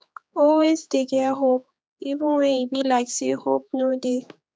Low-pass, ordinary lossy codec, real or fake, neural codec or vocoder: none; none; fake; codec, 16 kHz, 4 kbps, X-Codec, HuBERT features, trained on general audio